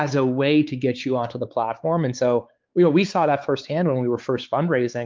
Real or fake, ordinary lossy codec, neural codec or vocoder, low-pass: fake; Opus, 32 kbps; codec, 16 kHz, 4 kbps, X-Codec, WavLM features, trained on Multilingual LibriSpeech; 7.2 kHz